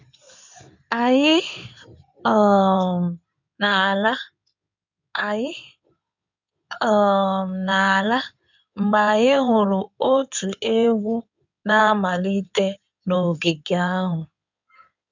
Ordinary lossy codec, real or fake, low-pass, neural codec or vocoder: none; fake; 7.2 kHz; codec, 16 kHz in and 24 kHz out, 2.2 kbps, FireRedTTS-2 codec